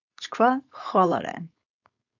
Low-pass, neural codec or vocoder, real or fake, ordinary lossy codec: 7.2 kHz; codec, 16 kHz, 4.8 kbps, FACodec; fake; AAC, 48 kbps